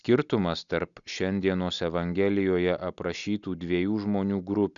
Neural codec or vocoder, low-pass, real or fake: none; 7.2 kHz; real